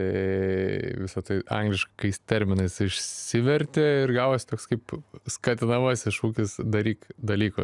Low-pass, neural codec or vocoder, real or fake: 10.8 kHz; none; real